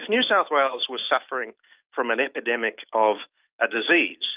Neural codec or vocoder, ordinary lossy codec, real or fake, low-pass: autoencoder, 48 kHz, 128 numbers a frame, DAC-VAE, trained on Japanese speech; Opus, 32 kbps; fake; 3.6 kHz